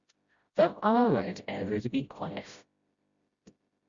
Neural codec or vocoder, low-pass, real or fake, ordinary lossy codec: codec, 16 kHz, 0.5 kbps, FreqCodec, smaller model; 7.2 kHz; fake; Opus, 64 kbps